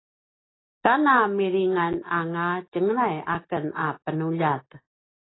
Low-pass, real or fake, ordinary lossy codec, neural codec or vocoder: 7.2 kHz; real; AAC, 16 kbps; none